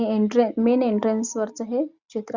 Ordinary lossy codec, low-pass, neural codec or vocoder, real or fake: Opus, 64 kbps; 7.2 kHz; none; real